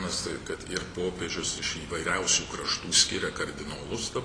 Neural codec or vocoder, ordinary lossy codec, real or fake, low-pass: none; AAC, 32 kbps; real; 9.9 kHz